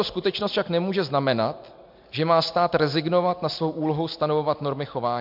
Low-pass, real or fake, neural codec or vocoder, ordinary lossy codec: 5.4 kHz; real; none; MP3, 48 kbps